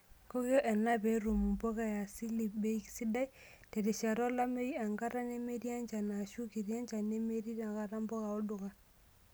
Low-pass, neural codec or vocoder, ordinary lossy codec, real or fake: none; none; none; real